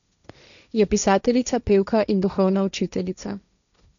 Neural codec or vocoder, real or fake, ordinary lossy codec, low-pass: codec, 16 kHz, 1.1 kbps, Voila-Tokenizer; fake; none; 7.2 kHz